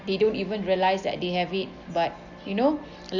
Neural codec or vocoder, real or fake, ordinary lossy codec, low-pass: none; real; none; 7.2 kHz